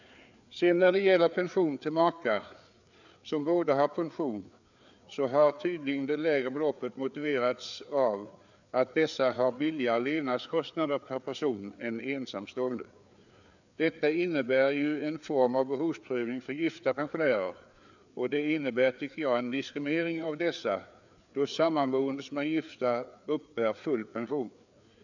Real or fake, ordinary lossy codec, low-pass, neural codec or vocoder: fake; none; 7.2 kHz; codec, 16 kHz, 4 kbps, FreqCodec, larger model